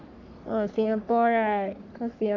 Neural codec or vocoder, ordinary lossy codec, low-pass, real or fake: codec, 44.1 kHz, 3.4 kbps, Pupu-Codec; none; 7.2 kHz; fake